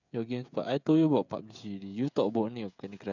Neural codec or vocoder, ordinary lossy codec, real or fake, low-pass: codec, 16 kHz, 16 kbps, FreqCodec, smaller model; none; fake; 7.2 kHz